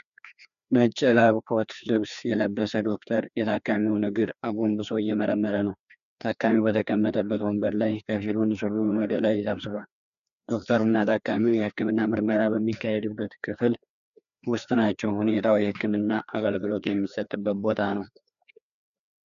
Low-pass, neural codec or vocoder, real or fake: 7.2 kHz; codec, 16 kHz, 2 kbps, FreqCodec, larger model; fake